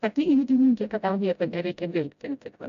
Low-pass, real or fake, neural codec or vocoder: 7.2 kHz; fake; codec, 16 kHz, 0.5 kbps, FreqCodec, smaller model